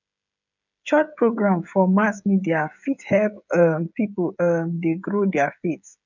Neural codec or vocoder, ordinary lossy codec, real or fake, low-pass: codec, 16 kHz, 16 kbps, FreqCodec, smaller model; none; fake; 7.2 kHz